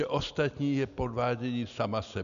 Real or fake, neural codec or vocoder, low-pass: real; none; 7.2 kHz